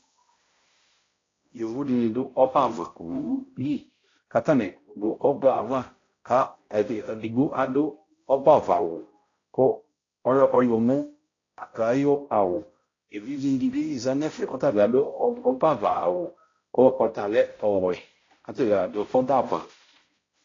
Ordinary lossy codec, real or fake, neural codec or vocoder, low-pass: AAC, 32 kbps; fake; codec, 16 kHz, 0.5 kbps, X-Codec, HuBERT features, trained on balanced general audio; 7.2 kHz